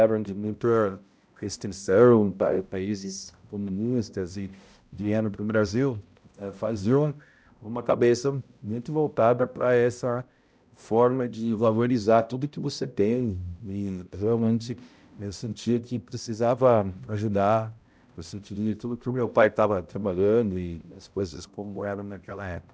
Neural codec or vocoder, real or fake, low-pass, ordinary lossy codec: codec, 16 kHz, 0.5 kbps, X-Codec, HuBERT features, trained on balanced general audio; fake; none; none